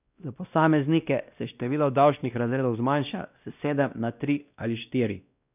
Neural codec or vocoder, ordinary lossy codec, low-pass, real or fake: codec, 16 kHz, 1 kbps, X-Codec, WavLM features, trained on Multilingual LibriSpeech; none; 3.6 kHz; fake